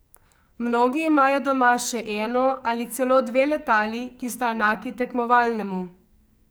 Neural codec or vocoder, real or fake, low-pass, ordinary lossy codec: codec, 44.1 kHz, 2.6 kbps, SNAC; fake; none; none